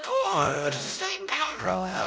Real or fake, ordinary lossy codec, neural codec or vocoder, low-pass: fake; none; codec, 16 kHz, 0.5 kbps, X-Codec, WavLM features, trained on Multilingual LibriSpeech; none